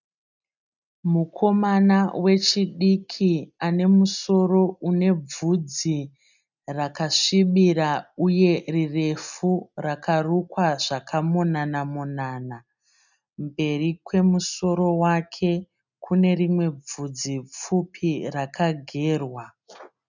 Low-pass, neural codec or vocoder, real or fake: 7.2 kHz; none; real